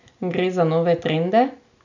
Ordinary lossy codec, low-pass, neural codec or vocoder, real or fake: none; 7.2 kHz; none; real